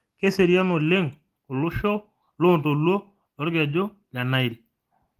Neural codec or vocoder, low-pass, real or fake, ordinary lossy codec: none; 14.4 kHz; real; Opus, 24 kbps